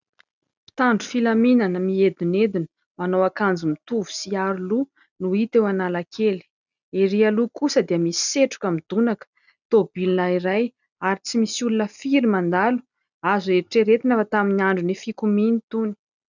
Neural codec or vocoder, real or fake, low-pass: none; real; 7.2 kHz